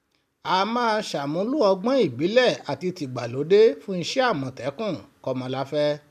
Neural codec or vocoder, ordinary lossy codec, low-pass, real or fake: none; none; 14.4 kHz; real